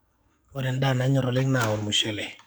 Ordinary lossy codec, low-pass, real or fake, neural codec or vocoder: none; none; fake; codec, 44.1 kHz, 7.8 kbps, DAC